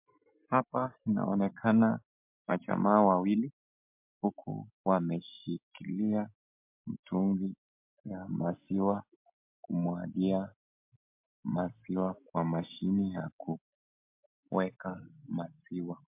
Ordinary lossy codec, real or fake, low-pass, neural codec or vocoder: MP3, 32 kbps; real; 3.6 kHz; none